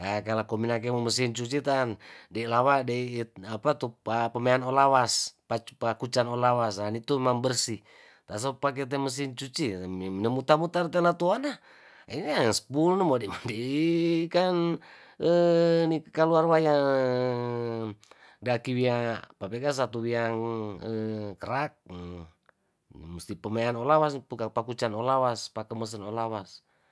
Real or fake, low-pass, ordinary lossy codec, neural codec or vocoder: real; none; none; none